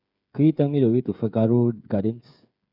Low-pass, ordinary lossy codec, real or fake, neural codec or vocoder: 5.4 kHz; none; fake; codec, 16 kHz, 8 kbps, FreqCodec, smaller model